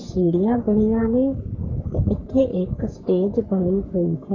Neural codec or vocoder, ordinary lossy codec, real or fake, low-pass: codec, 44.1 kHz, 3.4 kbps, Pupu-Codec; none; fake; 7.2 kHz